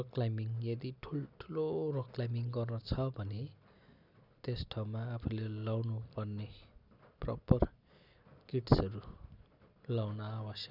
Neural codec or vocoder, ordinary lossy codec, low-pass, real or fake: none; none; 5.4 kHz; real